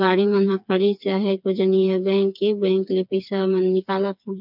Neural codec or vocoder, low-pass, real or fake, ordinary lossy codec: codec, 16 kHz, 4 kbps, FreqCodec, smaller model; 5.4 kHz; fake; none